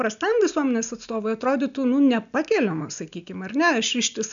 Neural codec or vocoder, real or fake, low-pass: none; real; 7.2 kHz